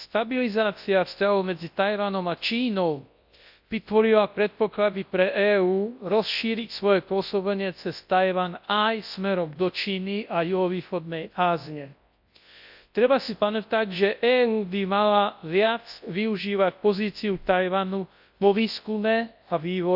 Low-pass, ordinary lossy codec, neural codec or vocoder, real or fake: 5.4 kHz; AAC, 48 kbps; codec, 24 kHz, 0.9 kbps, WavTokenizer, large speech release; fake